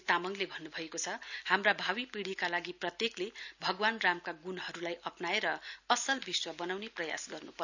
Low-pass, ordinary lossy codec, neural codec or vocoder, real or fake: 7.2 kHz; none; none; real